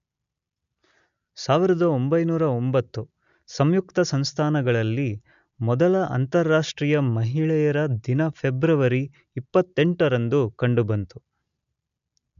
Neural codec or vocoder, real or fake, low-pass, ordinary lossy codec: none; real; 7.2 kHz; none